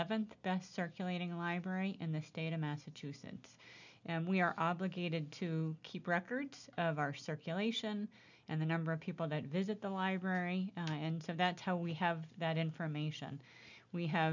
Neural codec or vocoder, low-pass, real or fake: none; 7.2 kHz; real